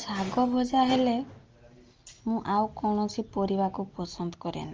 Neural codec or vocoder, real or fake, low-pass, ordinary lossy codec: none; real; 7.2 kHz; Opus, 24 kbps